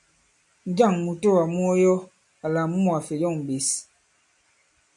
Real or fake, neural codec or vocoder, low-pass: real; none; 10.8 kHz